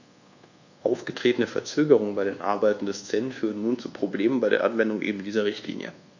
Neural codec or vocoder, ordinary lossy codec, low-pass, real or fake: codec, 24 kHz, 1.2 kbps, DualCodec; none; 7.2 kHz; fake